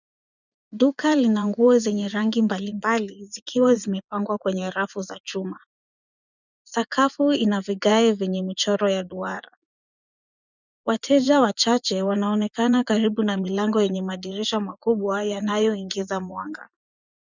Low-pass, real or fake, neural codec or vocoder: 7.2 kHz; fake; vocoder, 24 kHz, 100 mel bands, Vocos